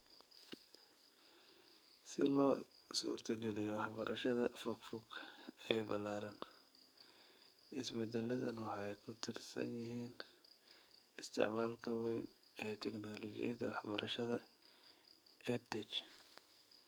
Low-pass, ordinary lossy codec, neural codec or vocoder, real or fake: none; none; codec, 44.1 kHz, 2.6 kbps, SNAC; fake